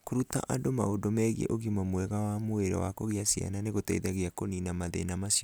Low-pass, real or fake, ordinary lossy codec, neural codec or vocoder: none; real; none; none